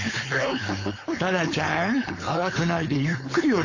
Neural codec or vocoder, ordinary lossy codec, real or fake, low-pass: codec, 16 kHz, 4.8 kbps, FACodec; AAC, 32 kbps; fake; 7.2 kHz